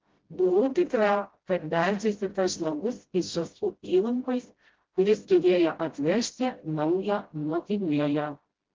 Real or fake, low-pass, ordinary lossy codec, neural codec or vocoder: fake; 7.2 kHz; Opus, 16 kbps; codec, 16 kHz, 0.5 kbps, FreqCodec, smaller model